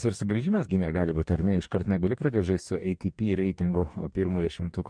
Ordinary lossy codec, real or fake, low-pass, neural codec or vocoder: MP3, 64 kbps; fake; 9.9 kHz; codec, 44.1 kHz, 2.6 kbps, DAC